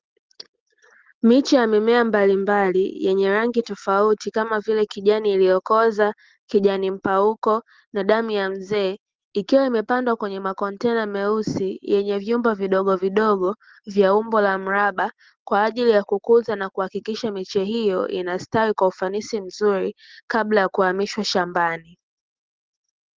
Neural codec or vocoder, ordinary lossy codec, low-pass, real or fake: none; Opus, 16 kbps; 7.2 kHz; real